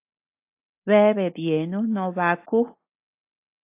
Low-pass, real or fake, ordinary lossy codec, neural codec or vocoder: 3.6 kHz; real; AAC, 24 kbps; none